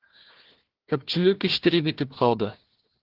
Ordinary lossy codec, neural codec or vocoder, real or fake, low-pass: Opus, 16 kbps; codec, 16 kHz, 1 kbps, FunCodec, trained on Chinese and English, 50 frames a second; fake; 5.4 kHz